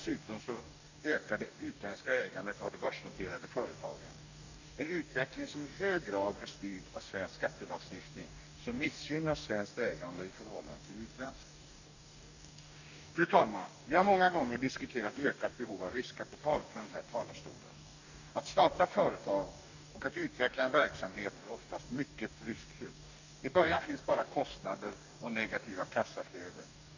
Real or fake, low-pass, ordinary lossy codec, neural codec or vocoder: fake; 7.2 kHz; none; codec, 44.1 kHz, 2.6 kbps, DAC